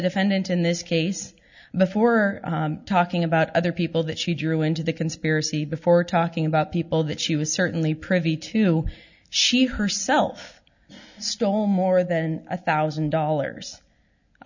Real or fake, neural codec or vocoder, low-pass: real; none; 7.2 kHz